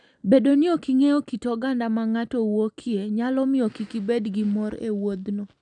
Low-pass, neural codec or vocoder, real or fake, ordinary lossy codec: 9.9 kHz; none; real; none